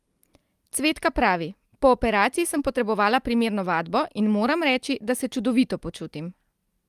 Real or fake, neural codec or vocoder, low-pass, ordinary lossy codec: real; none; 14.4 kHz; Opus, 32 kbps